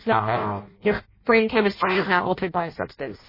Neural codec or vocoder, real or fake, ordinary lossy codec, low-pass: codec, 16 kHz in and 24 kHz out, 0.6 kbps, FireRedTTS-2 codec; fake; MP3, 24 kbps; 5.4 kHz